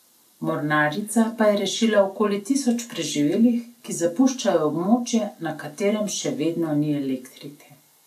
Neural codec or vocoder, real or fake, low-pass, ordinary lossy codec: none; real; 14.4 kHz; none